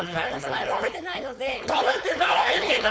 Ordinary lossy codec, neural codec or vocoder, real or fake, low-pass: none; codec, 16 kHz, 4.8 kbps, FACodec; fake; none